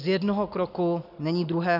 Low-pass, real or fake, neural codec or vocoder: 5.4 kHz; real; none